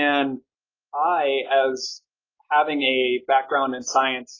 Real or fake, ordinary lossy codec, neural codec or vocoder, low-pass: real; AAC, 32 kbps; none; 7.2 kHz